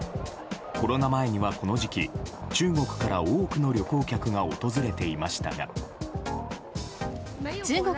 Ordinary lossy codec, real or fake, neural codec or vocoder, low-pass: none; real; none; none